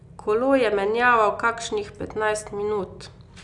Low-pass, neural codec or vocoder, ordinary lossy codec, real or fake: 10.8 kHz; none; none; real